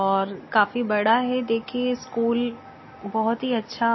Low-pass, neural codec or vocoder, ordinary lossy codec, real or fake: 7.2 kHz; none; MP3, 24 kbps; real